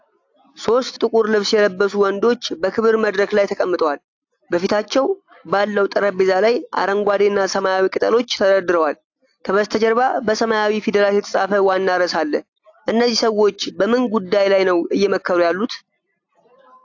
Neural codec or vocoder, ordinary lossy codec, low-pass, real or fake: none; AAC, 48 kbps; 7.2 kHz; real